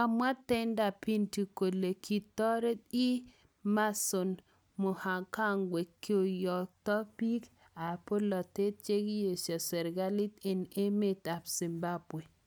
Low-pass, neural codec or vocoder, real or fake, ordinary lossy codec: none; none; real; none